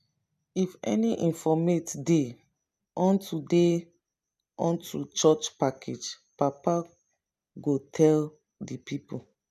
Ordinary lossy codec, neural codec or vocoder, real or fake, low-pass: none; none; real; 14.4 kHz